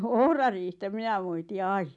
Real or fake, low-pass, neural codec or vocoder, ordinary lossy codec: real; 10.8 kHz; none; none